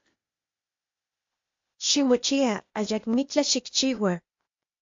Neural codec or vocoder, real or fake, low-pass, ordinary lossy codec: codec, 16 kHz, 0.8 kbps, ZipCodec; fake; 7.2 kHz; MP3, 48 kbps